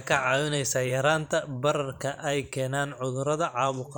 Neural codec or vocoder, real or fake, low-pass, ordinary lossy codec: vocoder, 44.1 kHz, 128 mel bands every 512 samples, BigVGAN v2; fake; none; none